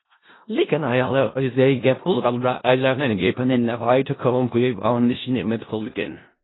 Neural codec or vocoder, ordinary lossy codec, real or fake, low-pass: codec, 16 kHz in and 24 kHz out, 0.4 kbps, LongCat-Audio-Codec, four codebook decoder; AAC, 16 kbps; fake; 7.2 kHz